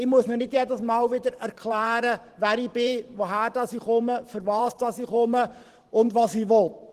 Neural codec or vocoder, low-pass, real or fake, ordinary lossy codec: vocoder, 44.1 kHz, 128 mel bands every 512 samples, BigVGAN v2; 14.4 kHz; fake; Opus, 16 kbps